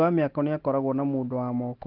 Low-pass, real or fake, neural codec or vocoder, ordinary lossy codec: 5.4 kHz; real; none; Opus, 16 kbps